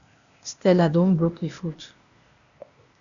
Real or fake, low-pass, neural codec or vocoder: fake; 7.2 kHz; codec, 16 kHz, 0.8 kbps, ZipCodec